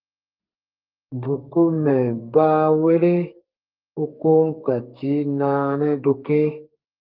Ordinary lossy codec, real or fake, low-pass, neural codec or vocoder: Opus, 32 kbps; fake; 5.4 kHz; codec, 32 kHz, 1.9 kbps, SNAC